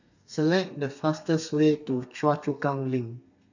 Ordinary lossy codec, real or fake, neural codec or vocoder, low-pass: none; fake; codec, 32 kHz, 1.9 kbps, SNAC; 7.2 kHz